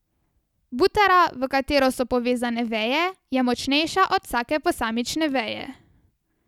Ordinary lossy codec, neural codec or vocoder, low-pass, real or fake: none; none; 19.8 kHz; real